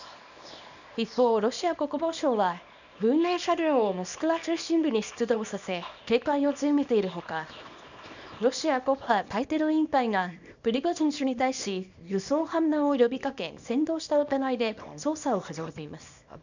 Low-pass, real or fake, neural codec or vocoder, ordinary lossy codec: 7.2 kHz; fake; codec, 24 kHz, 0.9 kbps, WavTokenizer, small release; none